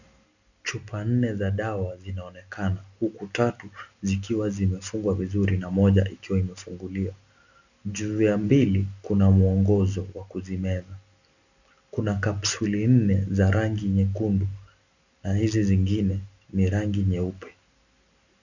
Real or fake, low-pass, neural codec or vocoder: real; 7.2 kHz; none